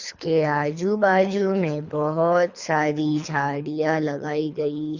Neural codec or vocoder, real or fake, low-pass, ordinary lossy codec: codec, 24 kHz, 3 kbps, HILCodec; fake; 7.2 kHz; Opus, 64 kbps